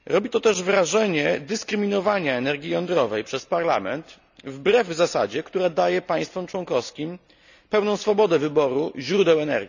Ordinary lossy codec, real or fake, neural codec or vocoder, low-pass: none; real; none; 7.2 kHz